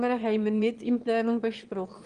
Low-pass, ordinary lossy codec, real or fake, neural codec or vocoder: 9.9 kHz; Opus, 24 kbps; fake; autoencoder, 22.05 kHz, a latent of 192 numbers a frame, VITS, trained on one speaker